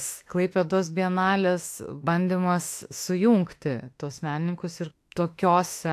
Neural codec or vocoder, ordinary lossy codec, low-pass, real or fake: autoencoder, 48 kHz, 32 numbers a frame, DAC-VAE, trained on Japanese speech; AAC, 64 kbps; 14.4 kHz; fake